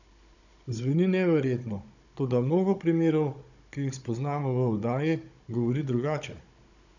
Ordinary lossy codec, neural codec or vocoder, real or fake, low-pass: none; codec, 16 kHz, 16 kbps, FunCodec, trained on Chinese and English, 50 frames a second; fake; 7.2 kHz